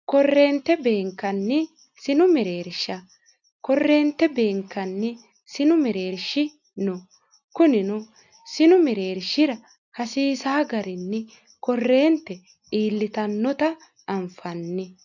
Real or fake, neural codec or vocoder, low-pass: real; none; 7.2 kHz